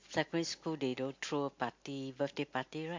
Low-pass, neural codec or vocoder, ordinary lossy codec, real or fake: 7.2 kHz; none; MP3, 48 kbps; real